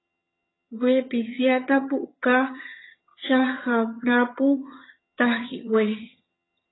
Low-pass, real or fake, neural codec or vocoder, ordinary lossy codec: 7.2 kHz; fake; vocoder, 22.05 kHz, 80 mel bands, HiFi-GAN; AAC, 16 kbps